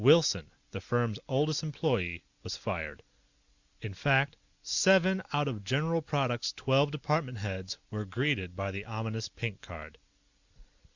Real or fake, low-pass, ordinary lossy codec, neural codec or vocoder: real; 7.2 kHz; Opus, 64 kbps; none